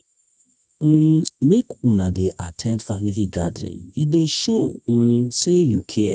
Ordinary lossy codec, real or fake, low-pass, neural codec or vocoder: none; fake; 10.8 kHz; codec, 24 kHz, 0.9 kbps, WavTokenizer, medium music audio release